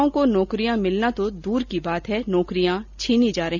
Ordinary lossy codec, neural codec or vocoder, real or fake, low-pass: none; none; real; 7.2 kHz